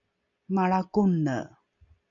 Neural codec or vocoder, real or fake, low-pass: none; real; 7.2 kHz